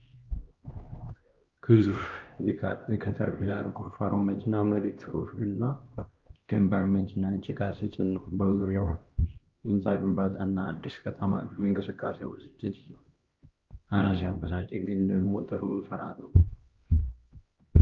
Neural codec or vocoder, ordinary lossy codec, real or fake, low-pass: codec, 16 kHz, 1 kbps, X-Codec, HuBERT features, trained on LibriSpeech; Opus, 32 kbps; fake; 7.2 kHz